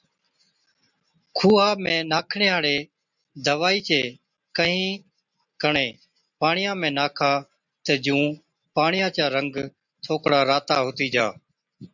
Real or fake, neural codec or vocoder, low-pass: real; none; 7.2 kHz